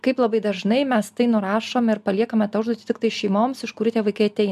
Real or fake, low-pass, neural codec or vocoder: real; 14.4 kHz; none